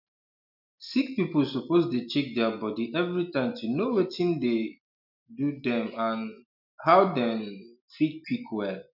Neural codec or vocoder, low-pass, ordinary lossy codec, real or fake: none; 5.4 kHz; none; real